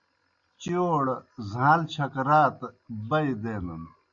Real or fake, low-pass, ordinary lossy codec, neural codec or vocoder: real; 7.2 kHz; Opus, 64 kbps; none